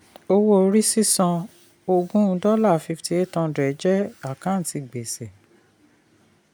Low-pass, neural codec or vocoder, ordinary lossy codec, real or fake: none; none; none; real